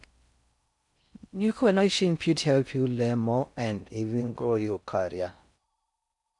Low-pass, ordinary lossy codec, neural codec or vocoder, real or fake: 10.8 kHz; none; codec, 16 kHz in and 24 kHz out, 0.6 kbps, FocalCodec, streaming, 4096 codes; fake